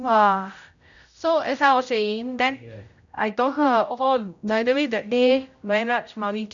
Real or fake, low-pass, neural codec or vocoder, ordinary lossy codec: fake; 7.2 kHz; codec, 16 kHz, 0.5 kbps, X-Codec, HuBERT features, trained on general audio; none